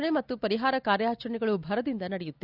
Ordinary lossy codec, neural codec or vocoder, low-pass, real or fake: Opus, 64 kbps; none; 5.4 kHz; real